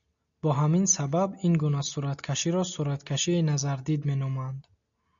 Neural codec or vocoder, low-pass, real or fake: none; 7.2 kHz; real